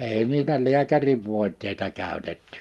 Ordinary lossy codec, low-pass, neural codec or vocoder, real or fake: Opus, 32 kbps; 19.8 kHz; none; real